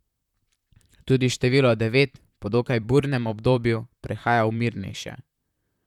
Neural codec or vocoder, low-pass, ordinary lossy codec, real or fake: vocoder, 44.1 kHz, 128 mel bands, Pupu-Vocoder; 19.8 kHz; none; fake